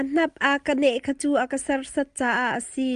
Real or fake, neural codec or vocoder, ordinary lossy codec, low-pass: real; none; Opus, 32 kbps; 10.8 kHz